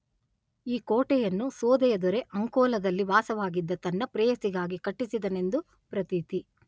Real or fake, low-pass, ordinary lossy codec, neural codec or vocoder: real; none; none; none